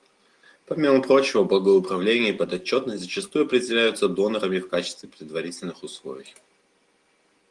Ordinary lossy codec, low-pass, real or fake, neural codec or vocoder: Opus, 24 kbps; 10.8 kHz; real; none